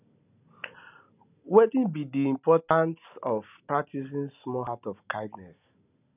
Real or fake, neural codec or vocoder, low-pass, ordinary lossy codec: real; none; 3.6 kHz; none